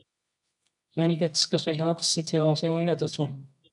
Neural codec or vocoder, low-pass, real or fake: codec, 24 kHz, 0.9 kbps, WavTokenizer, medium music audio release; 10.8 kHz; fake